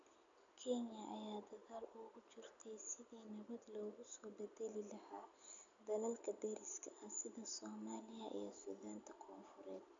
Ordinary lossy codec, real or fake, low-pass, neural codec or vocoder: none; real; 7.2 kHz; none